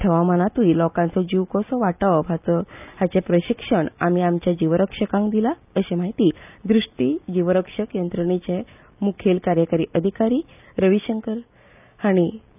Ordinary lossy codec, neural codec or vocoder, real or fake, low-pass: none; none; real; 3.6 kHz